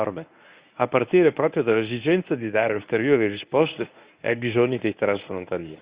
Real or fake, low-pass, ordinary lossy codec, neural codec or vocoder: fake; 3.6 kHz; Opus, 64 kbps; codec, 24 kHz, 0.9 kbps, WavTokenizer, medium speech release version 1